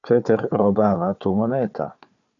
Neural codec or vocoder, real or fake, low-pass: codec, 16 kHz, 4 kbps, FunCodec, trained on Chinese and English, 50 frames a second; fake; 7.2 kHz